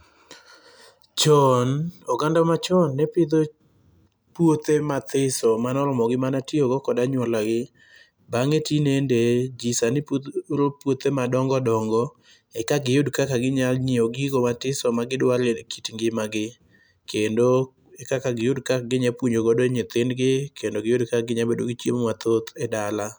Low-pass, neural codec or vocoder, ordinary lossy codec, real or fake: none; none; none; real